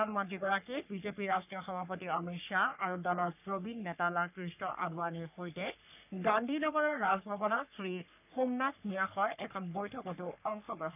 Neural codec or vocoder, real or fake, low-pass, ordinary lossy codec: codec, 44.1 kHz, 3.4 kbps, Pupu-Codec; fake; 3.6 kHz; none